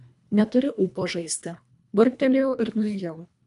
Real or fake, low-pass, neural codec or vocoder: fake; 10.8 kHz; codec, 24 kHz, 1.5 kbps, HILCodec